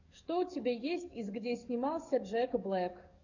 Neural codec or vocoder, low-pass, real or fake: codec, 44.1 kHz, 7.8 kbps, DAC; 7.2 kHz; fake